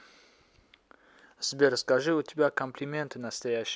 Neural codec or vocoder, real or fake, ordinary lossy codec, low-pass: none; real; none; none